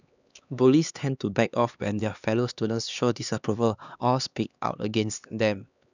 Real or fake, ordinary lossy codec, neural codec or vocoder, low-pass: fake; none; codec, 16 kHz, 2 kbps, X-Codec, HuBERT features, trained on LibriSpeech; 7.2 kHz